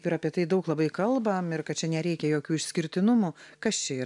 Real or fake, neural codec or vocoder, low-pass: real; none; 10.8 kHz